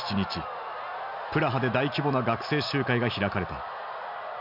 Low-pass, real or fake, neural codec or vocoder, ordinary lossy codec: 5.4 kHz; real; none; none